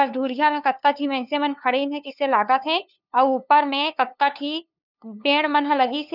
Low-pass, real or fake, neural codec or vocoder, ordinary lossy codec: 5.4 kHz; fake; codec, 16 kHz, 2 kbps, FunCodec, trained on LibriTTS, 25 frames a second; none